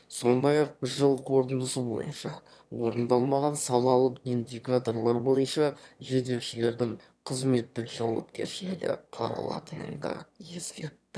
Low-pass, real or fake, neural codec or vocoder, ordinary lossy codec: none; fake; autoencoder, 22.05 kHz, a latent of 192 numbers a frame, VITS, trained on one speaker; none